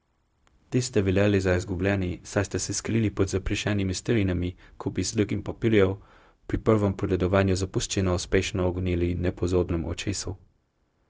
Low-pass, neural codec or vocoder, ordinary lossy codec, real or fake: none; codec, 16 kHz, 0.4 kbps, LongCat-Audio-Codec; none; fake